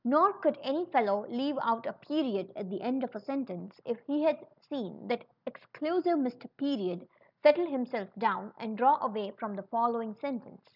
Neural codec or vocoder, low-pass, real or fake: none; 5.4 kHz; real